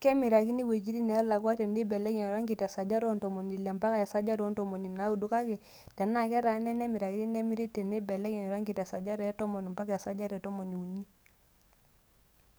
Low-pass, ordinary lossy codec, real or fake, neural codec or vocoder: none; none; fake; codec, 44.1 kHz, 7.8 kbps, DAC